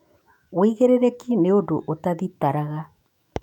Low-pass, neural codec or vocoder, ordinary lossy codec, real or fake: 19.8 kHz; autoencoder, 48 kHz, 128 numbers a frame, DAC-VAE, trained on Japanese speech; none; fake